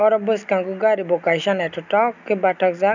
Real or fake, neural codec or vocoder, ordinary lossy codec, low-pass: real; none; none; 7.2 kHz